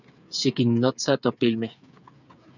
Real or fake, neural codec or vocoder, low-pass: fake; codec, 16 kHz, 8 kbps, FreqCodec, smaller model; 7.2 kHz